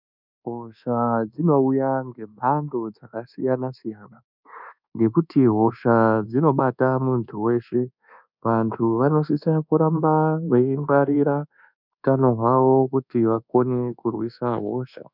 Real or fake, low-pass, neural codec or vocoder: fake; 5.4 kHz; codec, 24 kHz, 1.2 kbps, DualCodec